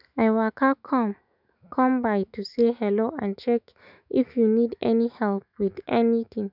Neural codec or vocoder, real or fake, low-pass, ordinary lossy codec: autoencoder, 48 kHz, 128 numbers a frame, DAC-VAE, trained on Japanese speech; fake; 5.4 kHz; none